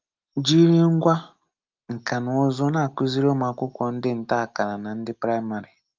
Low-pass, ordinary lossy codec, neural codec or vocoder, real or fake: 7.2 kHz; Opus, 24 kbps; none; real